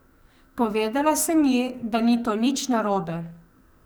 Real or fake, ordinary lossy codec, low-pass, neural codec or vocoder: fake; none; none; codec, 44.1 kHz, 2.6 kbps, SNAC